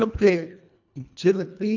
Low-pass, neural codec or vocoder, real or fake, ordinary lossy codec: 7.2 kHz; codec, 24 kHz, 1.5 kbps, HILCodec; fake; none